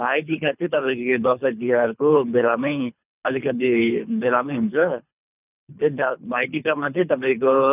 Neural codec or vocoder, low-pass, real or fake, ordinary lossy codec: codec, 24 kHz, 3 kbps, HILCodec; 3.6 kHz; fake; none